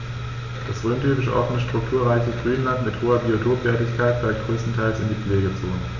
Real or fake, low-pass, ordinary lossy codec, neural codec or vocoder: real; 7.2 kHz; none; none